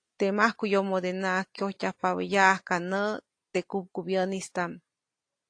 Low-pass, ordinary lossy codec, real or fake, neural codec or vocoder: 9.9 kHz; AAC, 48 kbps; real; none